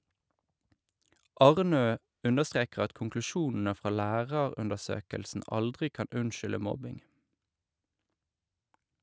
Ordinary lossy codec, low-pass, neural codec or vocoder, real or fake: none; none; none; real